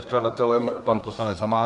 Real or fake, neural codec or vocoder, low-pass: fake; codec, 24 kHz, 1 kbps, SNAC; 10.8 kHz